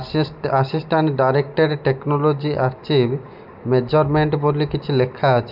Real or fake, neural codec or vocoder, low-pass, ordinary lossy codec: real; none; 5.4 kHz; none